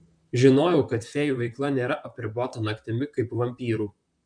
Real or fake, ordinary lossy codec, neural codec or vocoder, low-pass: fake; MP3, 96 kbps; vocoder, 44.1 kHz, 128 mel bands, Pupu-Vocoder; 9.9 kHz